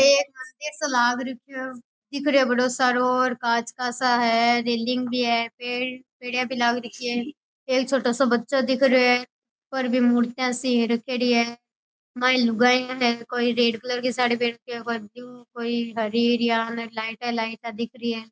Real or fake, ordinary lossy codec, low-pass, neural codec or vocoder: real; none; none; none